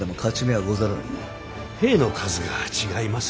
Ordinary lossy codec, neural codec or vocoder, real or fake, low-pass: none; none; real; none